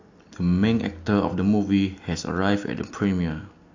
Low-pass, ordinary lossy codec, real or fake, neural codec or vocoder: 7.2 kHz; AAC, 48 kbps; real; none